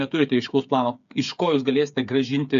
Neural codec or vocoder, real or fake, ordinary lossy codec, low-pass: codec, 16 kHz, 8 kbps, FreqCodec, smaller model; fake; AAC, 96 kbps; 7.2 kHz